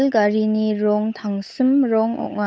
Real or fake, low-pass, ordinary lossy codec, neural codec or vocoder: fake; none; none; codec, 16 kHz, 8 kbps, FunCodec, trained on Chinese and English, 25 frames a second